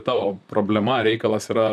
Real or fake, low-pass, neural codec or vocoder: fake; 14.4 kHz; vocoder, 44.1 kHz, 128 mel bands, Pupu-Vocoder